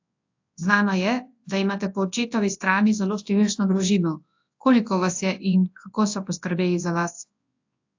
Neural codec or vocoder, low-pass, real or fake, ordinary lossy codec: codec, 24 kHz, 0.9 kbps, WavTokenizer, large speech release; 7.2 kHz; fake; AAC, 48 kbps